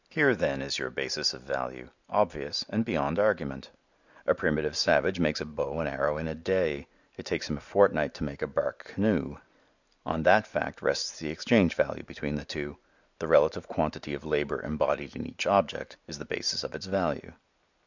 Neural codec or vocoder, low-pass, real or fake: none; 7.2 kHz; real